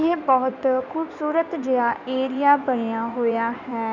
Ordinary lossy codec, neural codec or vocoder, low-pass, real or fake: none; codec, 16 kHz in and 24 kHz out, 2.2 kbps, FireRedTTS-2 codec; 7.2 kHz; fake